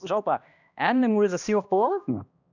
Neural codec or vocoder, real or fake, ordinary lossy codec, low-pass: codec, 16 kHz, 1 kbps, X-Codec, HuBERT features, trained on balanced general audio; fake; none; 7.2 kHz